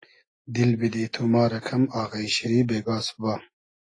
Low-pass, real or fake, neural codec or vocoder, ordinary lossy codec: 9.9 kHz; real; none; AAC, 32 kbps